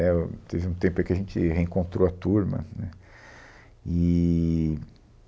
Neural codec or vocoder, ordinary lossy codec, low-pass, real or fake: none; none; none; real